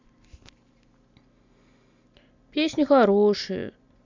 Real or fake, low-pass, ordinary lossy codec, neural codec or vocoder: real; 7.2 kHz; MP3, 64 kbps; none